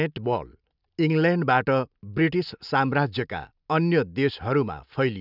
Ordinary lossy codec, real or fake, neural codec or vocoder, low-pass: none; real; none; 5.4 kHz